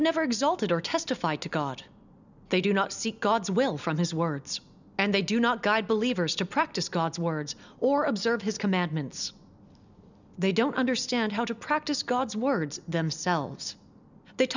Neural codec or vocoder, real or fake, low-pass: none; real; 7.2 kHz